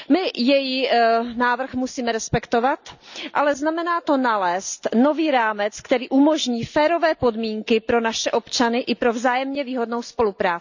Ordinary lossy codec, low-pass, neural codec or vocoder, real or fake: none; 7.2 kHz; none; real